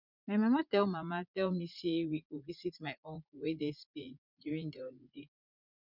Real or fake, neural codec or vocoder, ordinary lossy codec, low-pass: fake; vocoder, 44.1 kHz, 80 mel bands, Vocos; none; 5.4 kHz